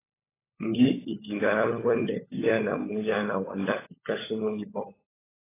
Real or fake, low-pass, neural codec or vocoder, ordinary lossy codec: fake; 3.6 kHz; codec, 16 kHz, 16 kbps, FunCodec, trained on LibriTTS, 50 frames a second; AAC, 16 kbps